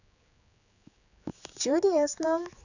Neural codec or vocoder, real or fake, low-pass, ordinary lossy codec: codec, 16 kHz, 4 kbps, X-Codec, HuBERT features, trained on general audio; fake; 7.2 kHz; none